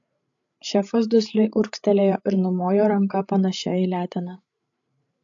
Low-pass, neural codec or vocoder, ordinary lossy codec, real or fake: 7.2 kHz; codec, 16 kHz, 8 kbps, FreqCodec, larger model; AAC, 64 kbps; fake